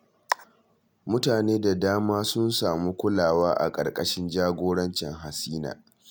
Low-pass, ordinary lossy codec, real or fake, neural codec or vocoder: none; none; real; none